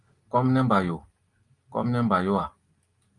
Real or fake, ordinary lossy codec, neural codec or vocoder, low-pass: real; Opus, 32 kbps; none; 10.8 kHz